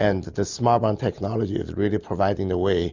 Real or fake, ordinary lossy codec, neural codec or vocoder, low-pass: real; Opus, 64 kbps; none; 7.2 kHz